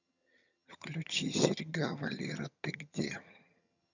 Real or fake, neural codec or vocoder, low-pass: fake; vocoder, 22.05 kHz, 80 mel bands, HiFi-GAN; 7.2 kHz